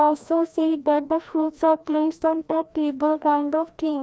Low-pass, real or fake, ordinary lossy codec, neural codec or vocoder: none; fake; none; codec, 16 kHz, 1 kbps, FreqCodec, larger model